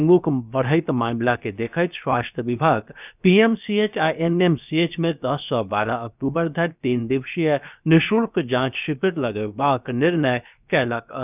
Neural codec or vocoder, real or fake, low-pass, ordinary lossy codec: codec, 16 kHz, 0.7 kbps, FocalCodec; fake; 3.6 kHz; none